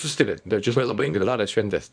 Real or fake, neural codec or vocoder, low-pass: fake; codec, 24 kHz, 0.9 kbps, WavTokenizer, small release; 9.9 kHz